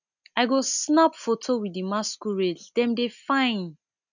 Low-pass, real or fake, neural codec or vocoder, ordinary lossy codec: 7.2 kHz; real; none; none